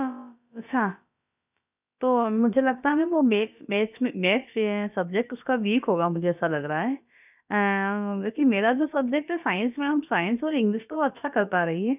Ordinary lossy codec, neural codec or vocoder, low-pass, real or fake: none; codec, 16 kHz, about 1 kbps, DyCAST, with the encoder's durations; 3.6 kHz; fake